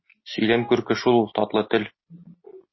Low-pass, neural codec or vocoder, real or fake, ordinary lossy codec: 7.2 kHz; none; real; MP3, 24 kbps